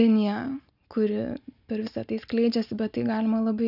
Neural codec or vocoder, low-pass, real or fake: none; 5.4 kHz; real